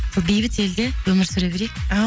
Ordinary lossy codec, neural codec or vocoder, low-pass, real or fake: none; none; none; real